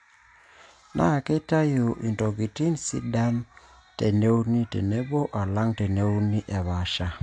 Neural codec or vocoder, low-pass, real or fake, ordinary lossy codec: none; 9.9 kHz; real; AAC, 64 kbps